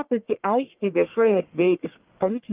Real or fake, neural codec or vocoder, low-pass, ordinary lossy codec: fake; codec, 44.1 kHz, 1.7 kbps, Pupu-Codec; 3.6 kHz; Opus, 24 kbps